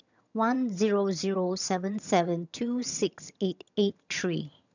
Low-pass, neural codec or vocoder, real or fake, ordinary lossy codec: 7.2 kHz; vocoder, 22.05 kHz, 80 mel bands, HiFi-GAN; fake; none